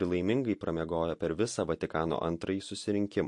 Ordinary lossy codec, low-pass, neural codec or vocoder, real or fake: MP3, 48 kbps; 10.8 kHz; none; real